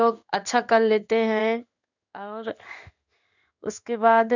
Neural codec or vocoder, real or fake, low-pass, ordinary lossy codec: codec, 16 kHz in and 24 kHz out, 1 kbps, XY-Tokenizer; fake; 7.2 kHz; none